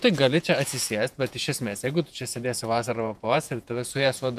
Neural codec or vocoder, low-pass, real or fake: vocoder, 48 kHz, 128 mel bands, Vocos; 14.4 kHz; fake